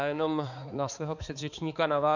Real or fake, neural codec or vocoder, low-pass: fake; codec, 16 kHz, 4 kbps, X-Codec, HuBERT features, trained on balanced general audio; 7.2 kHz